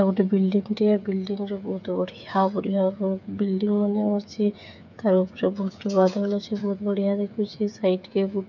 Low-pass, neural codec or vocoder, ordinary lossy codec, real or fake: 7.2 kHz; codec, 16 kHz, 8 kbps, FreqCodec, smaller model; none; fake